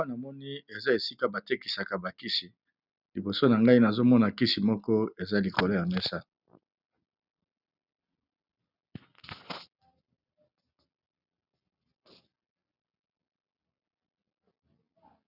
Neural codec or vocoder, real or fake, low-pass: none; real; 5.4 kHz